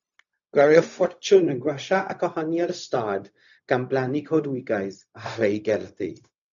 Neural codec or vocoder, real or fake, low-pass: codec, 16 kHz, 0.4 kbps, LongCat-Audio-Codec; fake; 7.2 kHz